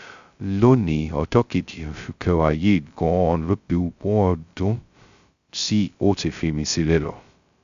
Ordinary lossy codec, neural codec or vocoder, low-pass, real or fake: Opus, 64 kbps; codec, 16 kHz, 0.2 kbps, FocalCodec; 7.2 kHz; fake